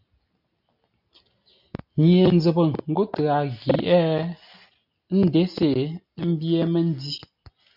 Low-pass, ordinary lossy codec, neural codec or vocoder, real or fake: 5.4 kHz; MP3, 48 kbps; none; real